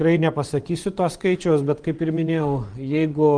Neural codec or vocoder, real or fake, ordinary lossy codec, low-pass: vocoder, 24 kHz, 100 mel bands, Vocos; fake; Opus, 24 kbps; 9.9 kHz